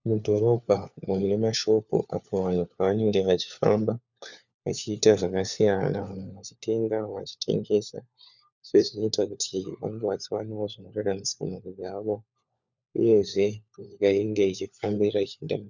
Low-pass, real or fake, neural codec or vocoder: 7.2 kHz; fake; codec, 16 kHz, 4 kbps, FunCodec, trained on LibriTTS, 50 frames a second